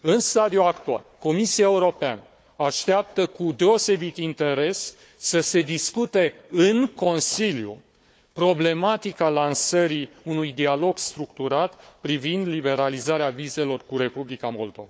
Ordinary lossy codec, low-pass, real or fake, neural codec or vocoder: none; none; fake; codec, 16 kHz, 4 kbps, FunCodec, trained on Chinese and English, 50 frames a second